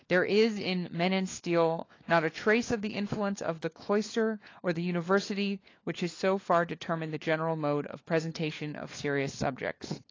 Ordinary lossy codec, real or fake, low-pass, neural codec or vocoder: AAC, 32 kbps; fake; 7.2 kHz; codec, 16 kHz, 4 kbps, FunCodec, trained on LibriTTS, 50 frames a second